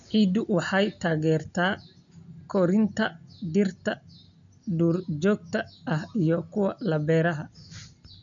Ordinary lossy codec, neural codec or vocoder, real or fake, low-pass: none; none; real; 7.2 kHz